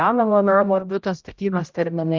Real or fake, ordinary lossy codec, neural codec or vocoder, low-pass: fake; Opus, 24 kbps; codec, 16 kHz, 0.5 kbps, X-Codec, HuBERT features, trained on general audio; 7.2 kHz